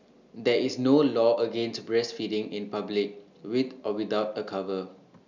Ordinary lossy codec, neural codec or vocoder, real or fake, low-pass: none; none; real; 7.2 kHz